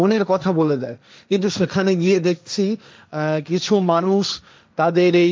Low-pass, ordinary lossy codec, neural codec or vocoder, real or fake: none; none; codec, 16 kHz, 1.1 kbps, Voila-Tokenizer; fake